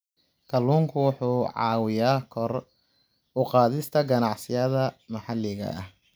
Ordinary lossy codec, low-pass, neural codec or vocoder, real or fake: none; none; none; real